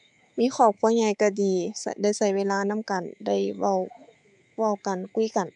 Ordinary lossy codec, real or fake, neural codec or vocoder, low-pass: MP3, 96 kbps; fake; codec, 24 kHz, 3.1 kbps, DualCodec; 10.8 kHz